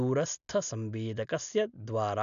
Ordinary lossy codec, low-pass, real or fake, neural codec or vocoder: none; 7.2 kHz; real; none